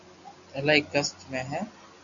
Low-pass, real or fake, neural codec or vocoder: 7.2 kHz; real; none